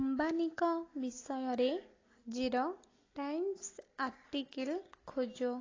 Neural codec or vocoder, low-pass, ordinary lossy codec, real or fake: none; 7.2 kHz; AAC, 32 kbps; real